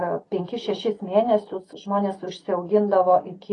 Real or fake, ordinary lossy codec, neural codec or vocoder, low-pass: real; AAC, 32 kbps; none; 10.8 kHz